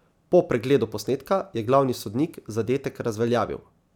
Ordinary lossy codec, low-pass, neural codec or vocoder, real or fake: none; 19.8 kHz; none; real